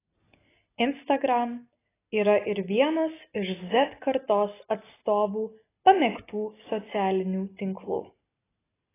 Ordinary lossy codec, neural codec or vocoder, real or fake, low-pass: AAC, 16 kbps; none; real; 3.6 kHz